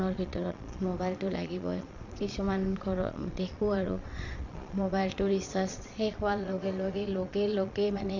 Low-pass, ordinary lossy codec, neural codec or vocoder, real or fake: 7.2 kHz; none; vocoder, 22.05 kHz, 80 mel bands, Vocos; fake